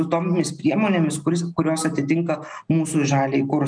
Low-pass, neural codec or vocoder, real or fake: 9.9 kHz; none; real